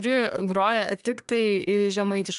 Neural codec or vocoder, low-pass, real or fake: codec, 24 kHz, 1 kbps, SNAC; 10.8 kHz; fake